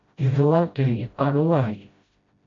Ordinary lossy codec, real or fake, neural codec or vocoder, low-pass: MP3, 48 kbps; fake; codec, 16 kHz, 0.5 kbps, FreqCodec, smaller model; 7.2 kHz